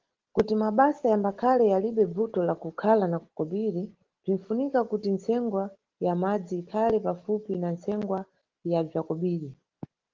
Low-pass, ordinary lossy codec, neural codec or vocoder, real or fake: 7.2 kHz; Opus, 16 kbps; none; real